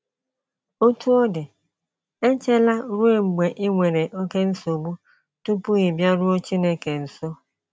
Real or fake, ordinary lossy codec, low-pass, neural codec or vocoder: real; none; none; none